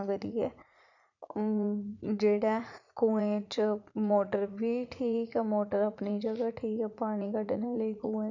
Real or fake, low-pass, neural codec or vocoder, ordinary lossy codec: fake; 7.2 kHz; vocoder, 44.1 kHz, 80 mel bands, Vocos; none